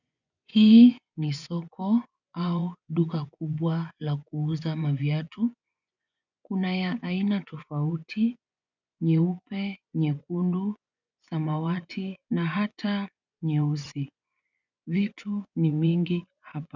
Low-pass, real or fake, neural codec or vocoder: 7.2 kHz; fake; vocoder, 24 kHz, 100 mel bands, Vocos